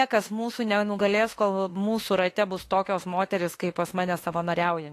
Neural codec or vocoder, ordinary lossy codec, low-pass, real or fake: autoencoder, 48 kHz, 32 numbers a frame, DAC-VAE, trained on Japanese speech; AAC, 48 kbps; 14.4 kHz; fake